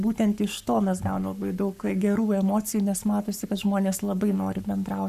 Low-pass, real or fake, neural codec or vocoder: 14.4 kHz; fake; codec, 44.1 kHz, 7.8 kbps, Pupu-Codec